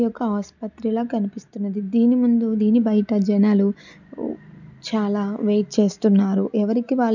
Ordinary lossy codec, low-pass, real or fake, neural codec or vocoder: none; 7.2 kHz; real; none